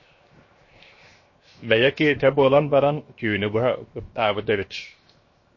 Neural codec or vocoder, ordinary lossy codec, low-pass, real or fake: codec, 16 kHz, 0.7 kbps, FocalCodec; MP3, 32 kbps; 7.2 kHz; fake